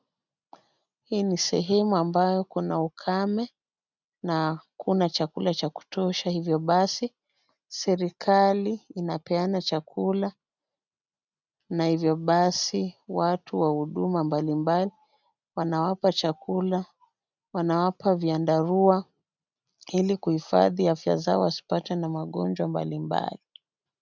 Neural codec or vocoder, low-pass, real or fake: none; 7.2 kHz; real